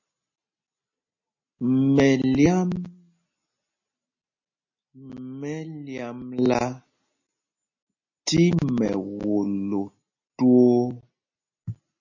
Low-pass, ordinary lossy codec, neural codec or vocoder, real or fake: 7.2 kHz; MP3, 32 kbps; none; real